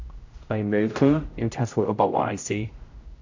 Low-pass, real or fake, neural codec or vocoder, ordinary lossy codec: 7.2 kHz; fake; codec, 16 kHz, 0.5 kbps, X-Codec, HuBERT features, trained on general audio; AAC, 48 kbps